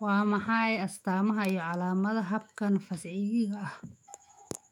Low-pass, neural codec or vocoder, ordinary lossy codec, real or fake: 19.8 kHz; autoencoder, 48 kHz, 128 numbers a frame, DAC-VAE, trained on Japanese speech; none; fake